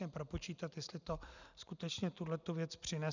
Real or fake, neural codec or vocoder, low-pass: real; none; 7.2 kHz